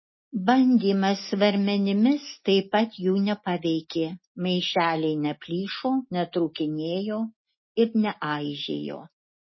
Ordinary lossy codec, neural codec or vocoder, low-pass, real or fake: MP3, 24 kbps; none; 7.2 kHz; real